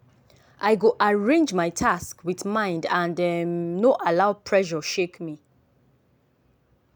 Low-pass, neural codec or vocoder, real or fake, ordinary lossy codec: none; none; real; none